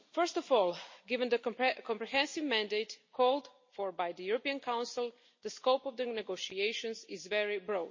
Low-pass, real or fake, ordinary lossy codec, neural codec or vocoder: 7.2 kHz; real; MP3, 32 kbps; none